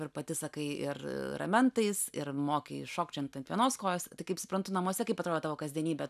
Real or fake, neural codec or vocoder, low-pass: real; none; 14.4 kHz